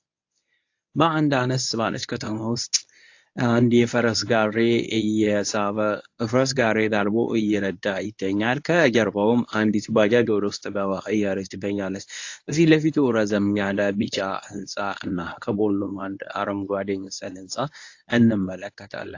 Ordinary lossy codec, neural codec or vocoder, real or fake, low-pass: AAC, 48 kbps; codec, 24 kHz, 0.9 kbps, WavTokenizer, medium speech release version 1; fake; 7.2 kHz